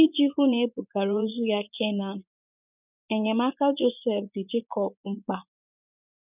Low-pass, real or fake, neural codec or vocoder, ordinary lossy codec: 3.6 kHz; fake; vocoder, 24 kHz, 100 mel bands, Vocos; none